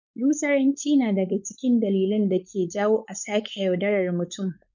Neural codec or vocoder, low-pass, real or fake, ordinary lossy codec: codec, 16 kHz, 4.8 kbps, FACodec; 7.2 kHz; fake; none